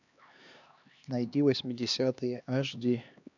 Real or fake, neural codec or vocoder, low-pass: fake; codec, 16 kHz, 2 kbps, X-Codec, HuBERT features, trained on LibriSpeech; 7.2 kHz